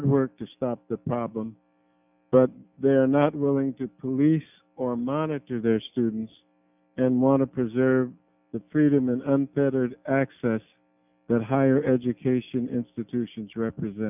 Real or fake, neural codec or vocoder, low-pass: real; none; 3.6 kHz